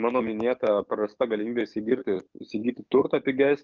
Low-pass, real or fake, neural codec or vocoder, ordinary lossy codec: 7.2 kHz; fake; codec, 44.1 kHz, 7.8 kbps, DAC; Opus, 24 kbps